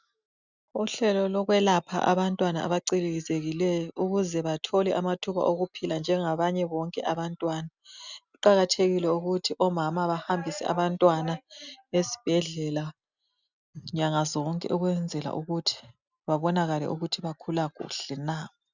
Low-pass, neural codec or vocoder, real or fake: 7.2 kHz; none; real